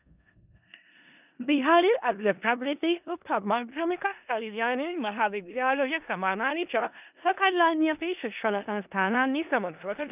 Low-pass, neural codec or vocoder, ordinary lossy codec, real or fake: 3.6 kHz; codec, 16 kHz in and 24 kHz out, 0.4 kbps, LongCat-Audio-Codec, four codebook decoder; none; fake